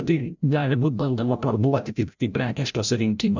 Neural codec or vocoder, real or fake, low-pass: codec, 16 kHz, 0.5 kbps, FreqCodec, larger model; fake; 7.2 kHz